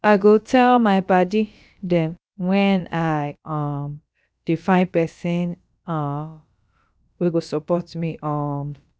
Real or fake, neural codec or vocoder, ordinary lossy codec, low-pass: fake; codec, 16 kHz, about 1 kbps, DyCAST, with the encoder's durations; none; none